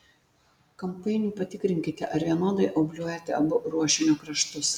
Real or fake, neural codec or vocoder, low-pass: real; none; 19.8 kHz